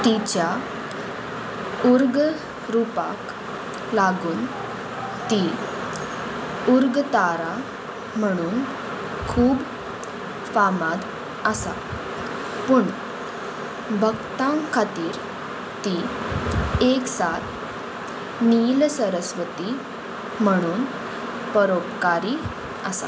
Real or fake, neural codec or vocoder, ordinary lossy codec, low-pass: real; none; none; none